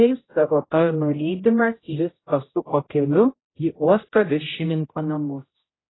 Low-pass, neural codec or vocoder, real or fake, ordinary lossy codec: 7.2 kHz; codec, 16 kHz, 0.5 kbps, X-Codec, HuBERT features, trained on general audio; fake; AAC, 16 kbps